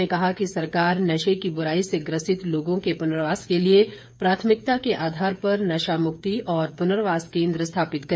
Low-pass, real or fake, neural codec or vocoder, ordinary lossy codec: none; fake; codec, 16 kHz, 8 kbps, FreqCodec, smaller model; none